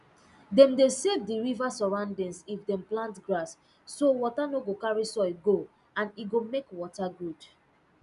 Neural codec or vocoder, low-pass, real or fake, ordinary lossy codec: none; 10.8 kHz; real; none